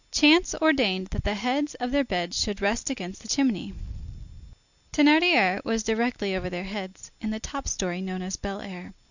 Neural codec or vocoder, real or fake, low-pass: none; real; 7.2 kHz